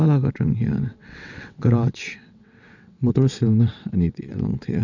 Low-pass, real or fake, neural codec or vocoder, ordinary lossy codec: 7.2 kHz; fake; vocoder, 22.05 kHz, 80 mel bands, WaveNeXt; none